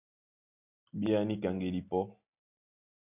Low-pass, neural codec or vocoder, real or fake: 3.6 kHz; none; real